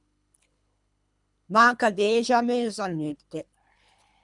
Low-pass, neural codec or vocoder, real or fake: 10.8 kHz; codec, 24 kHz, 3 kbps, HILCodec; fake